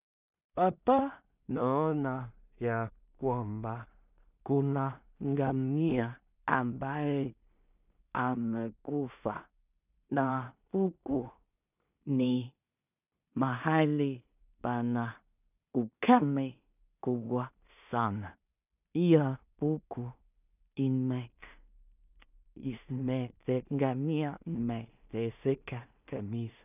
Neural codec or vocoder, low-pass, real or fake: codec, 16 kHz in and 24 kHz out, 0.4 kbps, LongCat-Audio-Codec, two codebook decoder; 3.6 kHz; fake